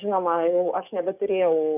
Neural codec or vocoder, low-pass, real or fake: codec, 44.1 kHz, 7.8 kbps, DAC; 3.6 kHz; fake